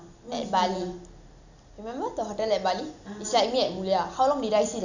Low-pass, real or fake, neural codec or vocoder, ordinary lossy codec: 7.2 kHz; real; none; none